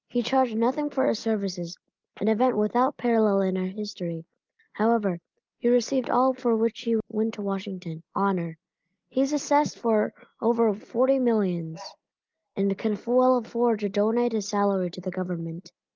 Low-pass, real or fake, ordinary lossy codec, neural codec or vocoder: 7.2 kHz; real; Opus, 32 kbps; none